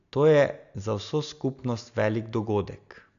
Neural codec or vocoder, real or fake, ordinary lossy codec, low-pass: none; real; none; 7.2 kHz